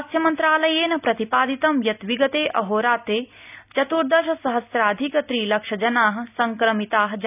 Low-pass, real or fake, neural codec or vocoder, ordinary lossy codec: 3.6 kHz; real; none; none